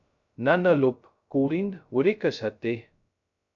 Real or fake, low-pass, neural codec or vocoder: fake; 7.2 kHz; codec, 16 kHz, 0.2 kbps, FocalCodec